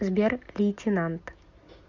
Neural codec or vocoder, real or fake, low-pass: none; real; 7.2 kHz